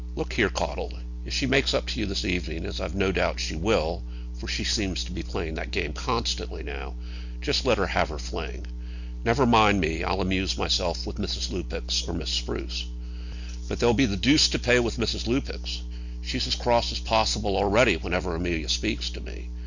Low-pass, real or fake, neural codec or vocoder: 7.2 kHz; real; none